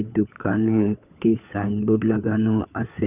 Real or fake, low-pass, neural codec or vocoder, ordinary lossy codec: fake; 3.6 kHz; codec, 16 kHz, 4 kbps, FunCodec, trained on LibriTTS, 50 frames a second; none